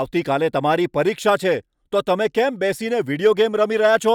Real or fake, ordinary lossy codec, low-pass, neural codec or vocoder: real; none; 19.8 kHz; none